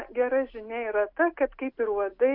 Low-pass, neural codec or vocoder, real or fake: 5.4 kHz; none; real